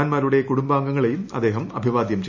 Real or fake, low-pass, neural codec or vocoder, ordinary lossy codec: real; 7.2 kHz; none; none